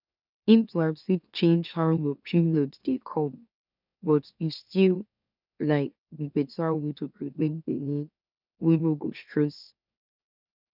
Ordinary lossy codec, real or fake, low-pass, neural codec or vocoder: none; fake; 5.4 kHz; autoencoder, 44.1 kHz, a latent of 192 numbers a frame, MeloTTS